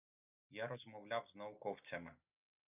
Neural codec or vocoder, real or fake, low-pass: none; real; 3.6 kHz